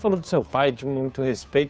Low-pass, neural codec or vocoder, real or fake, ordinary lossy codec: none; codec, 16 kHz, 2 kbps, FunCodec, trained on Chinese and English, 25 frames a second; fake; none